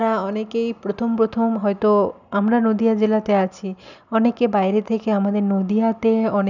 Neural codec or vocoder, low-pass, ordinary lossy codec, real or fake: none; 7.2 kHz; none; real